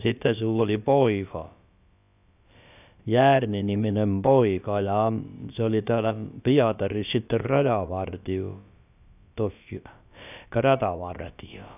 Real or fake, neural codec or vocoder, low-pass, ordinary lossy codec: fake; codec, 16 kHz, about 1 kbps, DyCAST, with the encoder's durations; 3.6 kHz; none